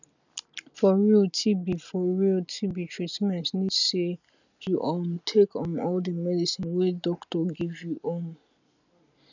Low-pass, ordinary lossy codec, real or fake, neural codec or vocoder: 7.2 kHz; none; real; none